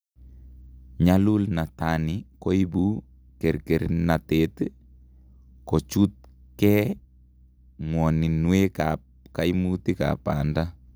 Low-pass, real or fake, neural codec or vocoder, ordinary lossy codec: none; real; none; none